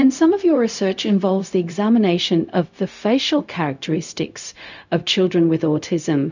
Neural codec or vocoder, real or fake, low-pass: codec, 16 kHz, 0.4 kbps, LongCat-Audio-Codec; fake; 7.2 kHz